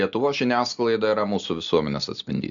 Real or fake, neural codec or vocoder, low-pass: real; none; 7.2 kHz